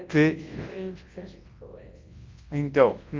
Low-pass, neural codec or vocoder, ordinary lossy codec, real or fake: 7.2 kHz; codec, 24 kHz, 0.9 kbps, WavTokenizer, large speech release; Opus, 32 kbps; fake